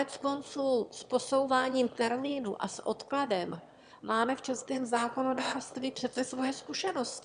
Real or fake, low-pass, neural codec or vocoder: fake; 9.9 kHz; autoencoder, 22.05 kHz, a latent of 192 numbers a frame, VITS, trained on one speaker